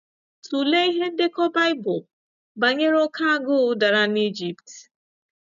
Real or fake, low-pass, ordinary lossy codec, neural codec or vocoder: real; 7.2 kHz; none; none